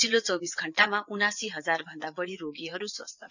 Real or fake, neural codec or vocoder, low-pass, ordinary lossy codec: fake; vocoder, 22.05 kHz, 80 mel bands, WaveNeXt; 7.2 kHz; none